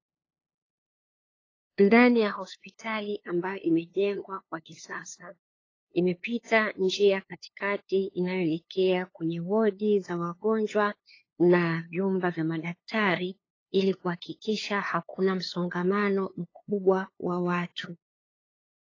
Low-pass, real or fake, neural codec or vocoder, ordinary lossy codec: 7.2 kHz; fake; codec, 16 kHz, 2 kbps, FunCodec, trained on LibriTTS, 25 frames a second; AAC, 32 kbps